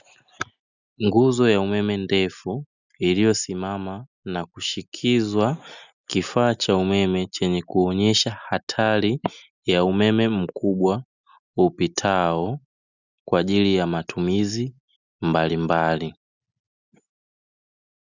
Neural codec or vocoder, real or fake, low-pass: none; real; 7.2 kHz